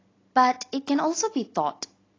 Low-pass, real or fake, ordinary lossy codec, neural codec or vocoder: 7.2 kHz; real; AAC, 32 kbps; none